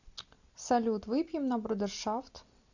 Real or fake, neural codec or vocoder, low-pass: real; none; 7.2 kHz